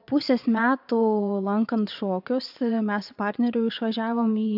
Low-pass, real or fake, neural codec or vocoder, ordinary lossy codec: 5.4 kHz; fake; vocoder, 22.05 kHz, 80 mel bands, Vocos; AAC, 48 kbps